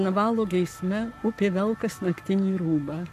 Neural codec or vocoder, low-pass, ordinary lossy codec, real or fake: codec, 44.1 kHz, 7.8 kbps, Pupu-Codec; 14.4 kHz; AAC, 96 kbps; fake